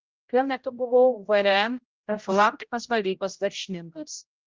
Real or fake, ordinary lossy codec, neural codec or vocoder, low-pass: fake; Opus, 32 kbps; codec, 16 kHz, 0.5 kbps, X-Codec, HuBERT features, trained on general audio; 7.2 kHz